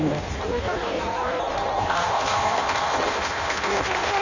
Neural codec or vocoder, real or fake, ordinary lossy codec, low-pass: codec, 16 kHz in and 24 kHz out, 0.6 kbps, FireRedTTS-2 codec; fake; none; 7.2 kHz